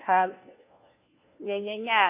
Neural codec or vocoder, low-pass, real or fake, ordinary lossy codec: codec, 16 kHz, 1 kbps, FunCodec, trained on LibriTTS, 50 frames a second; 3.6 kHz; fake; MP3, 24 kbps